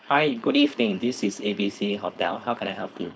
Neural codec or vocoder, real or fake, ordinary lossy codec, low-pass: codec, 16 kHz, 4.8 kbps, FACodec; fake; none; none